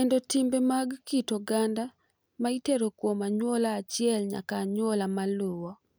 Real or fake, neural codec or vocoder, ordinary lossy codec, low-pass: real; none; none; none